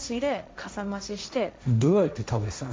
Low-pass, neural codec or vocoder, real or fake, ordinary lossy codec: none; codec, 16 kHz, 1.1 kbps, Voila-Tokenizer; fake; none